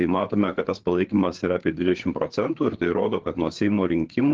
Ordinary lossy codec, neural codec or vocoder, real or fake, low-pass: Opus, 16 kbps; codec, 16 kHz, 4 kbps, FreqCodec, larger model; fake; 7.2 kHz